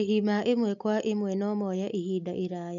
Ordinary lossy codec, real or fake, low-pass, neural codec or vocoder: none; real; 7.2 kHz; none